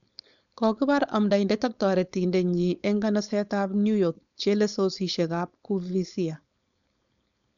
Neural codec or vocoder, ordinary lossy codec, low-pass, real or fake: codec, 16 kHz, 4.8 kbps, FACodec; none; 7.2 kHz; fake